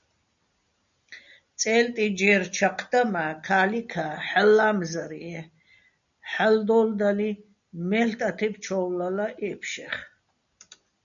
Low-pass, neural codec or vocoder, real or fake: 7.2 kHz; none; real